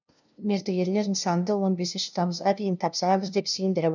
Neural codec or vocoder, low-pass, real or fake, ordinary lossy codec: codec, 16 kHz, 0.5 kbps, FunCodec, trained on LibriTTS, 25 frames a second; 7.2 kHz; fake; none